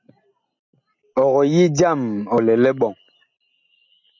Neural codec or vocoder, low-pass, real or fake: none; 7.2 kHz; real